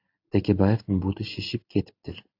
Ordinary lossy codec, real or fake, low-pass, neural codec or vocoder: AAC, 48 kbps; real; 5.4 kHz; none